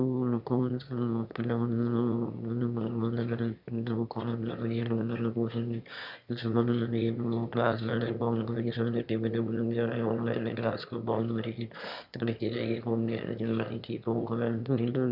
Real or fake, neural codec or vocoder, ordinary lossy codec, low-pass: fake; autoencoder, 22.05 kHz, a latent of 192 numbers a frame, VITS, trained on one speaker; none; 5.4 kHz